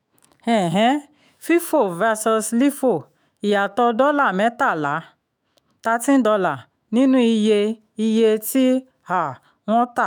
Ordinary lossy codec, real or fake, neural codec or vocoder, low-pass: none; fake; autoencoder, 48 kHz, 128 numbers a frame, DAC-VAE, trained on Japanese speech; none